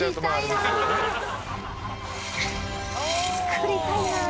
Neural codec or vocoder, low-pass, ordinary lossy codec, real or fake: none; none; none; real